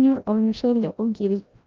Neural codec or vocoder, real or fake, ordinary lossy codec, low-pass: codec, 16 kHz, 0.5 kbps, FreqCodec, larger model; fake; Opus, 24 kbps; 7.2 kHz